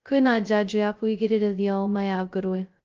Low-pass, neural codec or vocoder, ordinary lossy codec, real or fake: 7.2 kHz; codec, 16 kHz, 0.2 kbps, FocalCodec; Opus, 24 kbps; fake